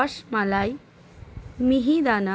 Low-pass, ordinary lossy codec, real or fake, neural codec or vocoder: none; none; real; none